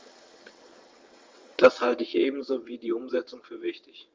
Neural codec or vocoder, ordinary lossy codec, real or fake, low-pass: vocoder, 22.05 kHz, 80 mel bands, WaveNeXt; Opus, 32 kbps; fake; 7.2 kHz